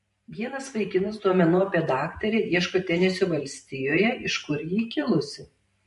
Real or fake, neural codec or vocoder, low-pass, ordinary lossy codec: fake; vocoder, 44.1 kHz, 128 mel bands every 512 samples, BigVGAN v2; 14.4 kHz; MP3, 48 kbps